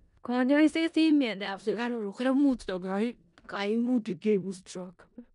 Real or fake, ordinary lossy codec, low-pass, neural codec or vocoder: fake; none; 10.8 kHz; codec, 16 kHz in and 24 kHz out, 0.4 kbps, LongCat-Audio-Codec, four codebook decoder